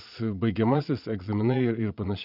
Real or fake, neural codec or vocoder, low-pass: fake; vocoder, 22.05 kHz, 80 mel bands, Vocos; 5.4 kHz